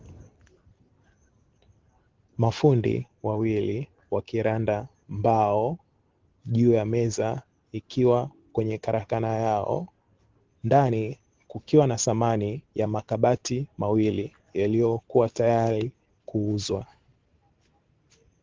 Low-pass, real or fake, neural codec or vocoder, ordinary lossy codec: 7.2 kHz; real; none; Opus, 16 kbps